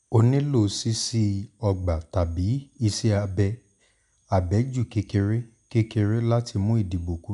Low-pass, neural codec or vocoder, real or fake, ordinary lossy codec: 10.8 kHz; none; real; none